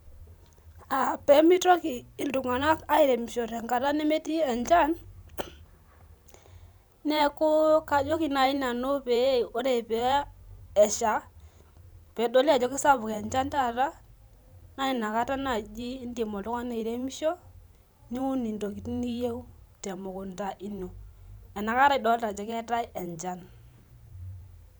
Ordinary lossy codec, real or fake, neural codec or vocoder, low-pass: none; fake; vocoder, 44.1 kHz, 128 mel bands every 512 samples, BigVGAN v2; none